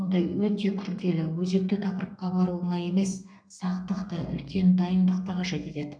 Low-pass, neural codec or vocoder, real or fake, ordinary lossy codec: 9.9 kHz; codec, 32 kHz, 1.9 kbps, SNAC; fake; none